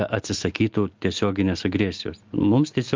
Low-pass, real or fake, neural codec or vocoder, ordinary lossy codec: 7.2 kHz; real; none; Opus, 24 kbps